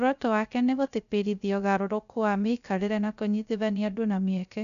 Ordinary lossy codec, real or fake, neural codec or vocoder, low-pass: none; fake; codec, 16 kHz, 0.3 kbps, FocalCodec; 7.2 kHz